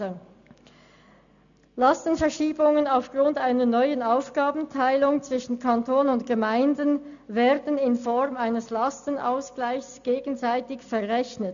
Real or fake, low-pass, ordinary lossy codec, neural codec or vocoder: real; 7.2 kHz; none; none